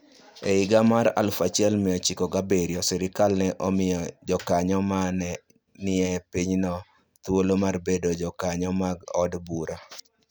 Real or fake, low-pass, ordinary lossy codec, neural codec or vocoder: real; none; none; none